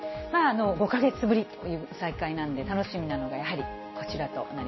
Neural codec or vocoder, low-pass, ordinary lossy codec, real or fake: none; 7.2 kHz; MP3, 24 kbps; real